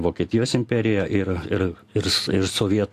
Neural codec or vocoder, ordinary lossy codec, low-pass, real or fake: none; AAC, 64 kbps; 14.4 kHz; real